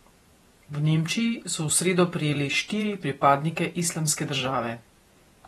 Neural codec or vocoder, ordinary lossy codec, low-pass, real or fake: vocoder, 48 kHz, 128 mel bands, Vocos; AAC, 32 kbps; 19.8 kHz; fake